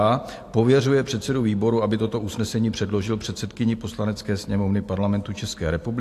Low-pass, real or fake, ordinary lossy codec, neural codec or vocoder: 14.4 kHz; real; AAC, 64 kbps; none